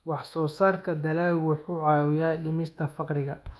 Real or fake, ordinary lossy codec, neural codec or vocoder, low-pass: fake; none; codec, 24 kHz, 1.2 kbps, DualCodec; none